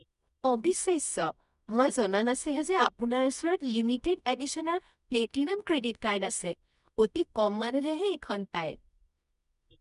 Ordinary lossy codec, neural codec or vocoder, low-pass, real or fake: none; codec, 24 kHz, 0.9 kbps, WavTokenizer, medium music audio release; 10.8 kHz; fake